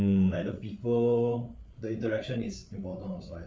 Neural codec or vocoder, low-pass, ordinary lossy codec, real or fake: codec, 16 kHz, 8 kbps, FreqCodec, larger model; none; none; fake